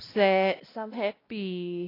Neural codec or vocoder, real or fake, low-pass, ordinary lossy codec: codec, 16 kHz, 1 kbps, X-Codec, WavLM features, trained on Multilingual LibriSpeech; fake; 5.4 kHz; AAC, 24 kbps